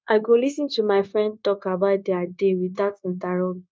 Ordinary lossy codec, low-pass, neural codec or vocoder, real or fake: none; none; codec, 16 kHz, 0.9 kbps, LongCat-Audio-Codec; fake